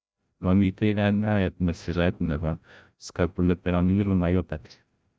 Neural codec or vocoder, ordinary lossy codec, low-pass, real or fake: codec, 16 kHz, 0.5 kbps, FreqCodec, larger model; none; none; fake